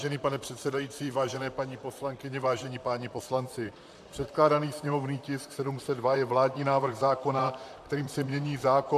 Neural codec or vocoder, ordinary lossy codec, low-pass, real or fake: vocoder, 44.1 kHz, 128 mel bands, Pupu-Vocoder; MP3, 96 kbps; 14.4 kHz; fake